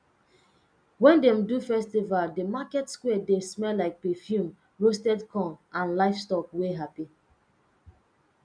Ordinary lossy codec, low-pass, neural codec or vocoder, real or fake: none; 9.9 kHz; none; real